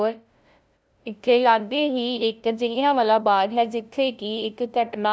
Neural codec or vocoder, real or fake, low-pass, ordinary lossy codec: codec, 16 kHz, 0.5 kbps, FunCodec, trained on LibriTTS, 25 frames a second; fake; none; none